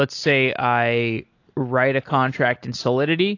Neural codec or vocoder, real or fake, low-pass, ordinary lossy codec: none; real; 7.2 kHz; AAC, 48 kbps